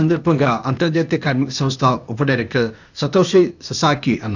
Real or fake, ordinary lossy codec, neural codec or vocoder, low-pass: fake; none; codec, 16 kHz, 0.8 kbps, ZipCodec; 7.2 kHz